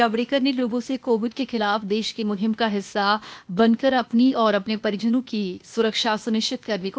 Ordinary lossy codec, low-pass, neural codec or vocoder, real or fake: none; none; codec, 16 kHz, 0.8 kbps, ZipCodec; fake